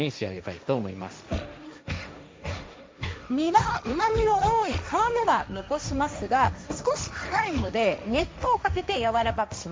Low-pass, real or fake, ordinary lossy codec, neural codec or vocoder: none; fake; none; codec, 16 kHz, 1.1 kbps, Voila-Tokenizer